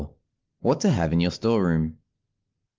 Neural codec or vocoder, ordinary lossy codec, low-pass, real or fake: none; Opus, 24 kbps; 7.2 kHz; real